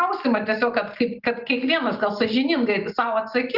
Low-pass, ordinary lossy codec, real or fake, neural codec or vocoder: 5.4 kHz; Opus, 32 kbps; real; none